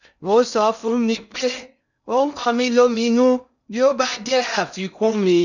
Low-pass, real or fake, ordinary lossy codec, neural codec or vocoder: 7.2 kHz; fake; none; codec, 16 kHz in and 24 kHz out, 0.6 kbps, FocalCodec, streaming, 2048 codes